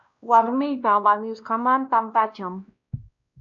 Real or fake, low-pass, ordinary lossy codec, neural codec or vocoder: fake; 7.2 kHz; AAC, 64 kbps; codec, 16 kHz, 1 kbps, X-Codec, WavLM features, trained on Multilingual LibriSpeech